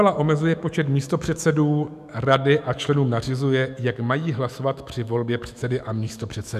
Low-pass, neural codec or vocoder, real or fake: 14.4 kHz; autoencoder, 48 kHz, 128 numbers a frame, DAC-VAE, trained on Japanese speech; fake